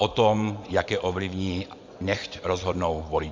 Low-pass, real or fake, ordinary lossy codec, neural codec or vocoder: 7.2 kHz; fake; MP3, 64 kbps; vocoder, 24 kHz, 100 mel bands, Vocos